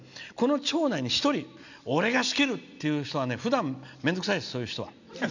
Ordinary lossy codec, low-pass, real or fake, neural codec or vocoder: none; 7.2 kHz; real; none